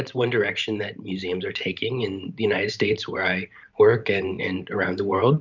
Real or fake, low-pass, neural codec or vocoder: fake; 7.2 kHz; vocoder, 44.1 kHz, 128 mel bands every 512 samples, BigVGAN v2